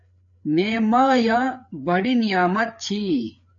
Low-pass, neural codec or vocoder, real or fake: 7.2 kHz; codec, 16 kHz, 4 kbps, FreqCodec, larger model; fake